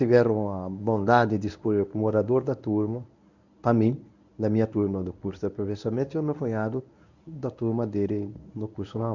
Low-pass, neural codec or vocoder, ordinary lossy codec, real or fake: 7.2 kHz; codec, 24 kHz, 0.9 kbps, WavTokenizer, medium speech release version 1; none; fake